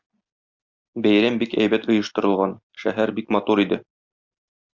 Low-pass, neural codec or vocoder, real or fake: 7.2 kHz; none; real